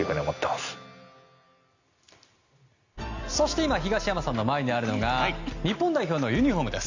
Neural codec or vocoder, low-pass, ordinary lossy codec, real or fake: none; 7.2 kHz; Opus, 64 kbps; real